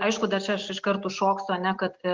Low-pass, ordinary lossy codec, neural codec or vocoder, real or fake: 7.2 kHz; Opus, 32 kbps; none; real